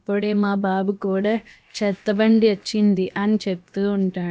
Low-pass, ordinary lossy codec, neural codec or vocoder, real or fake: none; none; codec, 16 kHz, about 1 kbps, DyCAST, with the encoder's durations; fake